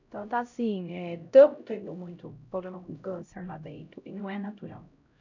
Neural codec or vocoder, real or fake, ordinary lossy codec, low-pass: codec, 16 kHz, 0.5 kbps, X-Codec, HuBERT features, trained on LibriSpeech; fake; none; 7.2 kHz